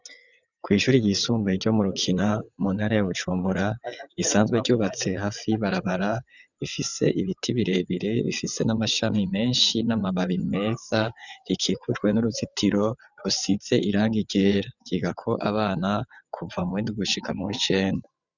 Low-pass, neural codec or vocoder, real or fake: 7.2 kHz; vocoder, 22.05 kHz, 80 mel bands, WaveNeXt; fake